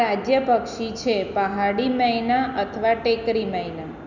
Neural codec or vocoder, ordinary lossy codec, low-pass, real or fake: none; none; 7.2 kHz; real